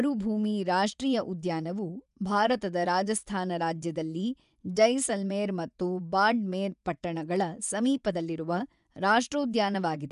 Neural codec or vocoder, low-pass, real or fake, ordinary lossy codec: vocoder, 24 kHz, 100 mel bands, Vocos; 10.8 kHz; fake; none